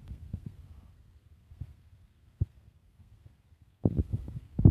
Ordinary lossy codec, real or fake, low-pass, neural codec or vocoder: none; fake; 14.4 kHz; codec, 32 kHz, 1.9 kbps, SNAC